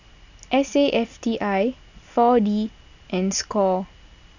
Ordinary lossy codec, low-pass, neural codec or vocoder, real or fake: none; 7.2 kHz; none; real